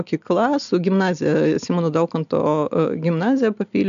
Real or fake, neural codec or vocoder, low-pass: real; none; 7.2 kHz